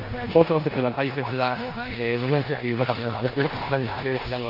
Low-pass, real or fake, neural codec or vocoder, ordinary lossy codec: 5.4 kHz; fake; codec, 16 kHz in and 24 kHz out, 0.9 kbps, LongCat-Audio-Codec, four codebook decoder; none